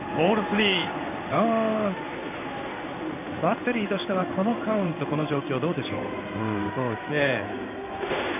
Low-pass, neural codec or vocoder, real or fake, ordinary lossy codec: 3.6 kHz; codec, 16 kHz in and 24 kHz out, 1 kbps, XY-Tokenizer; fake; none